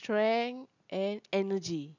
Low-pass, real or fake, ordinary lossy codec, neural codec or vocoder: 7.2 kHz; real; none; none